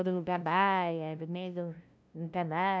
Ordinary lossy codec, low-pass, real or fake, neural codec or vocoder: none; none; fake; codec, 16 kHz, 0.5 kbps, FunCodec, trained on LibriTTS, 25 frames a second